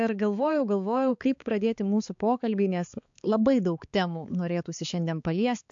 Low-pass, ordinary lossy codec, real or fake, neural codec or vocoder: 7.2 kHz; AAC, 64 kbps; fake; codec, 16 kHz, 4 kbps, X-Codec, HuBERT features, trained on balanced general audio